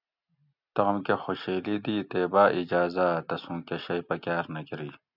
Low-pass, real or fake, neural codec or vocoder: 5.4 kHz; real; none